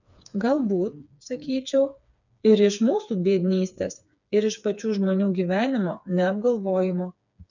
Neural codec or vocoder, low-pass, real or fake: codec, 16 kHz, 4 kbps, FreqCodec, smaller model; 7.2 kHz; fake